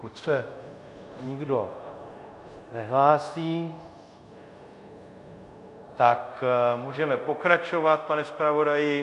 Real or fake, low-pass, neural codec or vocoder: fake; 10.8 kHz; codec, 24 kHz, 0.5 kbps, DualCodec